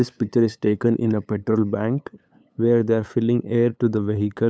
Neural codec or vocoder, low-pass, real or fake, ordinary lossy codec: codec, 16 kHz, 8 kbps, FunCodec, trained on LibriTTS, 25 frames a second; none; fake; none